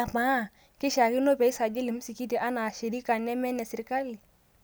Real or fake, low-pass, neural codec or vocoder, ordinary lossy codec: real; none; none; none